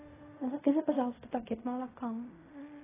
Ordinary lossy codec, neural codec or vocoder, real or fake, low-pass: AAC, 16 kbps; codec, 16 kHz in and 24 kHz out, 0.4 kbps, LongCat-Audio-Codec, fine tuned four codebook decoder; fake; 3.6 kHz